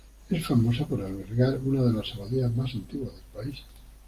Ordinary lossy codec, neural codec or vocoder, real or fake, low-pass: Opus, 32 kbps; none; real; 14.4 kHz